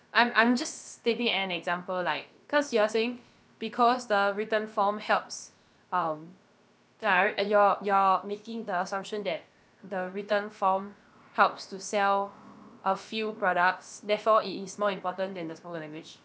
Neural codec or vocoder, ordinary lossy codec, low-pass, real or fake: codec, 16 kHz, about 1 kbps, DyCAST, with the encoder's durations; none; none; fake